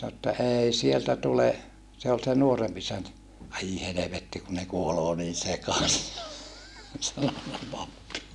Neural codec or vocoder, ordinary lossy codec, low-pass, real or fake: none; none; none; real